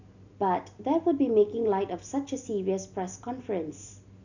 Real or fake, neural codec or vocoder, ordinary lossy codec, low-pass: real; none; none; 7.2 kHz